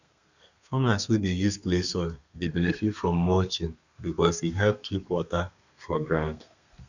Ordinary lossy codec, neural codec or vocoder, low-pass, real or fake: none; codec, 32 kHz, 1.9 kbps, SNAC; 7.2 kHz; fake